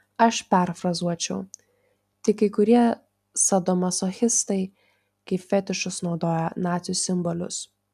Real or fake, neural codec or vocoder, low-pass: real; none; 14.4 kHz